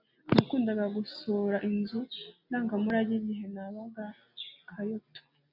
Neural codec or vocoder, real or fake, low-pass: none; real; 5.4 kHz